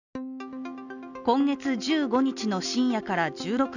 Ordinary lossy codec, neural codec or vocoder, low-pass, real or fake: none; none; 7.2 kHz; real